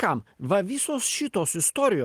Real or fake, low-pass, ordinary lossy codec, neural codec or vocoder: real; 14.4 kHz; Opus, 24 kbps; none